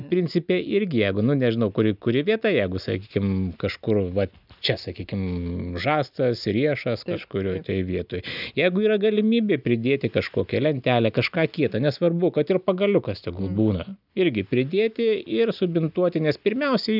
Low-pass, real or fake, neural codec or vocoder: 5.4 kHz; real; none